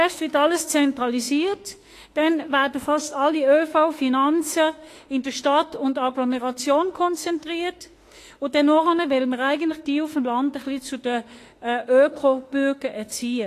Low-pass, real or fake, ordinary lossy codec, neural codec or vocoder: 14.4 kHz; fake; AAC, 48 kbps; autoencoder, 48 kHz, 32 numbers a frame, DAC-VAE, trained on Japanese speech